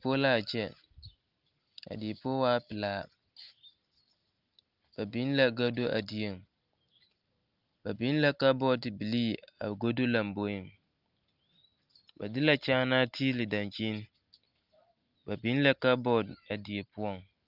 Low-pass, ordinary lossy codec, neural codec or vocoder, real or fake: 5.4 kHz; Opus, 24 kbps; none; real